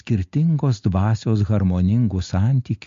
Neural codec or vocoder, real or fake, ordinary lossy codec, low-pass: none; real; MP3, 48 kbps; 7.2 kHz